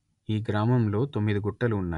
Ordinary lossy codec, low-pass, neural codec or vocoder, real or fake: none; 10.8 kHz; none; real